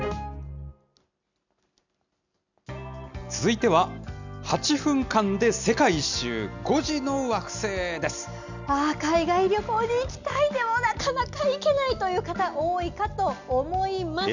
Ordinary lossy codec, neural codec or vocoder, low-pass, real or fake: none; none; 7.2 kHz; real